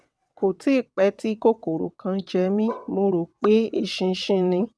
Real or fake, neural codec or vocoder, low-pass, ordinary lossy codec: fake; vocoder, 22.05 kHz, 80 mel bands, WaveNeXt; none; none